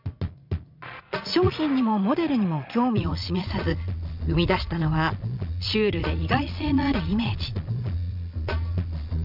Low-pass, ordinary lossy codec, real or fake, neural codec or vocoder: 5.4 kHz; none; fake; vocoder, 22.05 kHz, 80 mel bands, Vocos